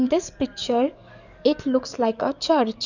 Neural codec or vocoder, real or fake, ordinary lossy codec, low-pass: codec, 44.1 kHz, 7.8 kbps, DAC; fake; none; 7.2 kHz